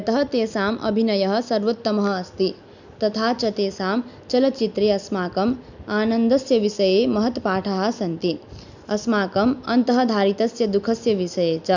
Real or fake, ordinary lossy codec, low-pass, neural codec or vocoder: real; none; 7.2 kHz; none